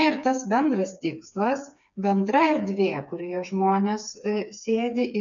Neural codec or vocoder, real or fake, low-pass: codec, 16 kHz, 4 kbps, FreqCodec, smaller model; fake; 7.2 kHz